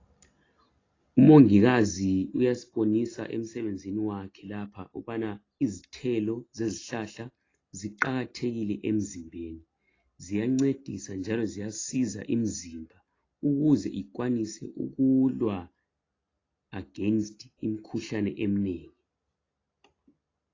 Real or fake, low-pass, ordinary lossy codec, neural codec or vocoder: real; 7.2 kHz; AAC, 32 kbps; none